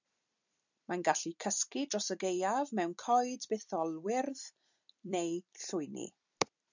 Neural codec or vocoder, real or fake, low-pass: vocoder, 44.1 kHz, 128 mel bands every 256 samples, BigVGAN v2; fake; 7.2 kHz